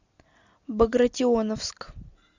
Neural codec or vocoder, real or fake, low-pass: none; real; 7.2 kHz